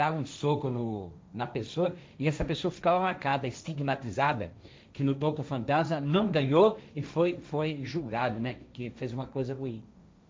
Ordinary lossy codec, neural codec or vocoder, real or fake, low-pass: none; codec, 16 kHz, 1.1 kbps, Voila-Tokenizer; fake; none